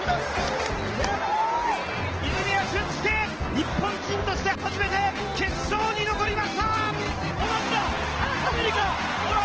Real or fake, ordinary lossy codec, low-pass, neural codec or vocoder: real; Opus, 16 kbps; 7.2 kHz; none